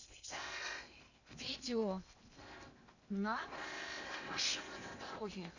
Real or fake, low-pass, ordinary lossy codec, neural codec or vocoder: fake; 7.2 kHz; none; codec, 16 kHz in and 24 kHz out, 0.8 kbps, FocalCodec, streaming, 65536 codes